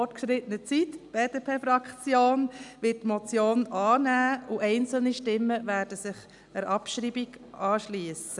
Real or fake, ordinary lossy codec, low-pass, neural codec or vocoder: real; none; 10.8 kHz; none